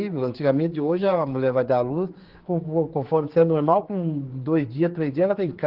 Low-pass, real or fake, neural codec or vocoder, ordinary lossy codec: 5.4 kHz; fake; codec, 16 kHz, 4 kbps, X-Codec, HuBERT features, trained on general audio; Opus, 16 kbps